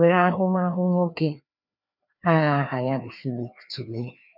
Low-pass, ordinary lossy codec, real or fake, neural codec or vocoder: 5.4 kHz; none; fake; codec, 16 kHz, 2 kbps, FreqCodec, larger model